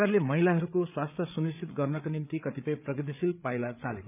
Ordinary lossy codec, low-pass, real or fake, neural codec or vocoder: none; 3.6 kHz; fake; codec, 16 kHz, 8 kbps, FreqCodec, larger model